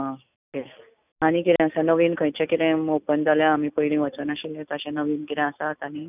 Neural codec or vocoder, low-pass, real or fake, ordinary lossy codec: none; 3.6 kHz; real; none